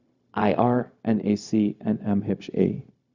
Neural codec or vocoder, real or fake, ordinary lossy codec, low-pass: codec, 16 kHz, 0.4 kbps, LongCat-Audio-Codec; fake; Opus, 64 kbps; 7.2 kHz